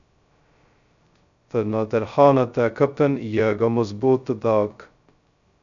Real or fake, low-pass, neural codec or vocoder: fake; 7.2 kHz; codec, 16 kHz, 0.2 kbps, FocalCodec